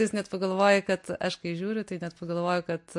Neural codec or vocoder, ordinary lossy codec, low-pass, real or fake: none; MP3, 48 kbps; 10.8 kHz; real